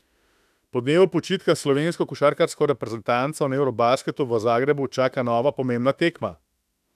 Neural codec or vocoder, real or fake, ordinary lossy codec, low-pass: autoencoder, 48 kHz, 32 numbers a frame, DAC-VAE, trained on Japanese speech; fake; none; 14.4 kHz